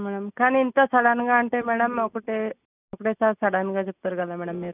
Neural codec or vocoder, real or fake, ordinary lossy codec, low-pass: none; real; none; 3.6 kHz